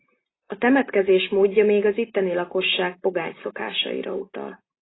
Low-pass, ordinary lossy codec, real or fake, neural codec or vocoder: 7.2 kHz; AAC, 16 kbps; real; none